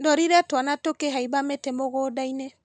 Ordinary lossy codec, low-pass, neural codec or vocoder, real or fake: none; 9.9 kHz; none; real